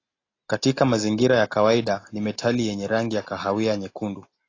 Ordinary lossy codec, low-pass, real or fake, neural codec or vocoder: AAC, 32 kbps; 7.2 kHz; real; none